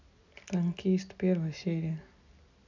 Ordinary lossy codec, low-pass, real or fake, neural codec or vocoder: none; 7.2 kHz; real; none